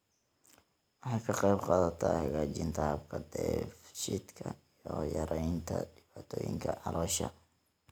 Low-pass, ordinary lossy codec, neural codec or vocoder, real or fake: none; none; none; real